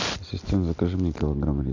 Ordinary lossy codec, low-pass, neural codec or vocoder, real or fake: MP3, 64 kbps; 7.2 kHz; none; real